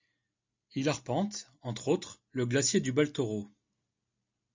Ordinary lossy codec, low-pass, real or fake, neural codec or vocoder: MP3, 64 kbps; 7.2 kHz; real; none